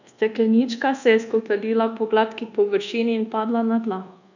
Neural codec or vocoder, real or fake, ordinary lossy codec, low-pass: codec, 24 kHz, 1.2 kbps, DualCodec; fake; none; 7.2 kHz